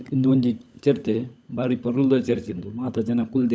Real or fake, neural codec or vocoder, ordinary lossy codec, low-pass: fake; codec, 16 kHz, 16 kbps, FunCodec, trained on LibriTTS, 50 frames a second; none; none